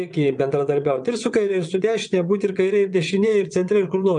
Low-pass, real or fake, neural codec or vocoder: 9.9 kHz; fake; vocoder, 22.05 kHz, 80 mel bands, WaveNeXt